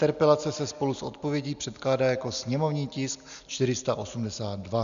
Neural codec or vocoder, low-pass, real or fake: none; 7.2 kHz; real